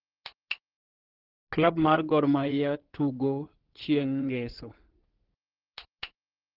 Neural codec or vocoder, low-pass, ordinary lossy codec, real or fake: codec, 16 kHz in and 24 kHz out, 2.2 kbps, FireRedTTS-2 codec; 5.4 kHz; Opus, 16 kbps; fake